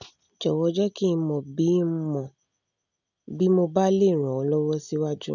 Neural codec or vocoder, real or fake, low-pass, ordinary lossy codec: none; real; 7.2 kHz; none